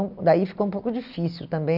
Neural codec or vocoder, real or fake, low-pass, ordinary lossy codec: none; real; 5.4 kHz; none